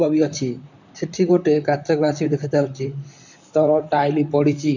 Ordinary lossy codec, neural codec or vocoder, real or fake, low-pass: none; vocoder, 44.1 kHz, 128 mel bands, Pupu-Vocoder; fake; 7.2 kHz